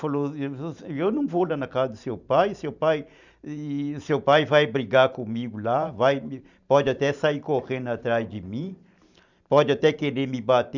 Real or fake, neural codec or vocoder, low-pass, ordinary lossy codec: fake; vocoder, 44.1 kHz, 128 mel bands every 512 samples, BigVGAN v2; 7.2 kHz; none